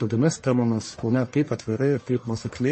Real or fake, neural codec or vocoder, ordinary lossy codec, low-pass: fake; codec, 44.1 kHz, 1.7 kbps, Pupu-Codec; MP3, 32 kbps; 9.9 kHz